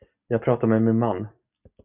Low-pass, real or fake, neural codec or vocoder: 3.6 kHz; real; none